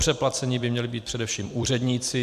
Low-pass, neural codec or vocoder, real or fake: 14.4 kHz; vocoder, 44.1 kHz, 128 mel bands every 256 samples, BigVGAN v2; fake